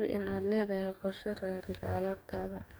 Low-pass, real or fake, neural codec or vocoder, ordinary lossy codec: none; fake; codec, 44.1 kHz, 2.6 kbps, DAC; none